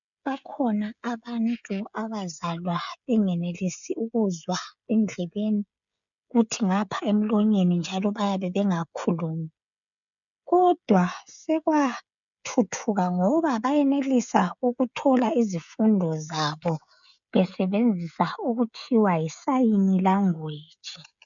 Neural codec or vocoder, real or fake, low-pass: codec, 16 kHz, 16 kbps, FreqCodec, smaller model; fake; 7.2 kHz